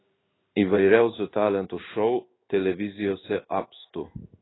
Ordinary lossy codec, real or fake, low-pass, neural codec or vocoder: AAC, 16 kbps; fake; 7.2 kHz; codec, 16 kHz in and 24 kHz out, 1 kbps, XY-Tokenizer